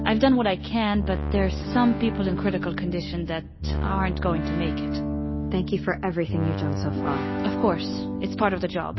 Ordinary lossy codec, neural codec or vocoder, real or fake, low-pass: MP3, 24 kbps; none; real; 7.2 kHz